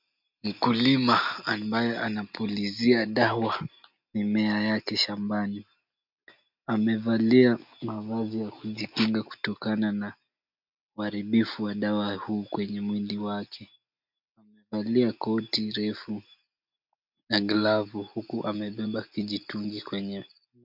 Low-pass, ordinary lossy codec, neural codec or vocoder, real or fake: 5.4 kHz; MP3, 48 kbps; none; real